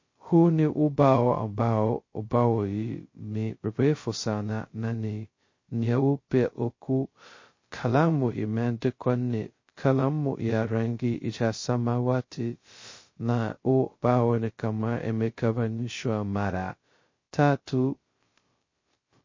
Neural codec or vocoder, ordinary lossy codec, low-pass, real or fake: codec, 16 kHz, 0.2 kbps, FocalCodec; MP3, 32 kbps; 7.2 kHz; fake